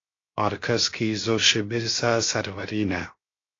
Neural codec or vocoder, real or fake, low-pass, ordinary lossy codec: codec, 16 kHz, 0.7 kbps, FocalCodec; fake; 7.2 kHz; AAC, 32 kbps